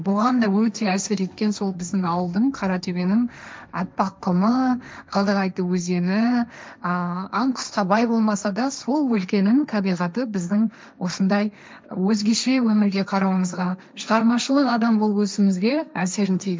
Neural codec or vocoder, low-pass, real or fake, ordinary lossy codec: codec, 16 kHz, 1.1 kbps, Voila-Tokenizer; none; fake; none